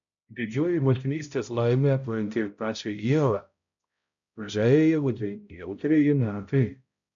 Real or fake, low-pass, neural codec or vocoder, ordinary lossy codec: fake; 7.2 kHz; codec, 16 kHz, 0.5 kbps, X-Codec, HuBERT features, trained on balanced general audio; MP3, 64 kbps